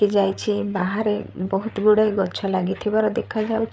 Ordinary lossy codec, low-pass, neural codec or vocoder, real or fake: none; none; codec, 16 kHz, 8 kbps, FreqCodec, larger model; fake